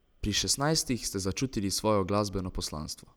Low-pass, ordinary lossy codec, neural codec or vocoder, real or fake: none; none; none; real